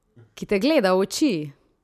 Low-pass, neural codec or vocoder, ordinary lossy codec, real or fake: 14.4 kHz; none; none; real